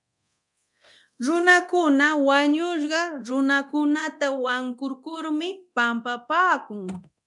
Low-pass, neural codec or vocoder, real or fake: 10.8 kHz; codec, 24 kHz, 0.9 kbps, DualCodec; fake